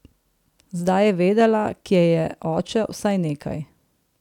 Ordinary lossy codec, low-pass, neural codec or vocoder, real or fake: none; 19.8 kHz; none; real